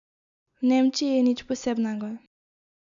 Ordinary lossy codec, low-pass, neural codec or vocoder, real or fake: none; 7.2 kHz; none; real